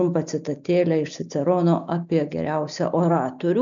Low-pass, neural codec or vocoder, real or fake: 7.2 kHz; none; real